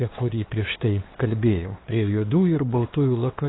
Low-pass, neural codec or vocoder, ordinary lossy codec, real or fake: 7.2 kHz; codec, 16 kHz, 2 kbps, FunCodec, trained on LibriTTS, 25 frames a second; AAC, 16 kbps; fake